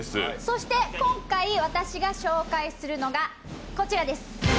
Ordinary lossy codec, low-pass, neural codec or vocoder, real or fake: none; none; none; real